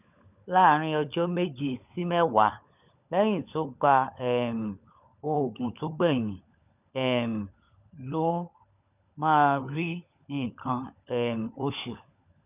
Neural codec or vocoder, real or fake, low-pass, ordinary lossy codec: codec, 16 kHz, 16 kbps, FunCodec, trained on LibriTTS, 50 frames a second; fake; 3.6 kHz; none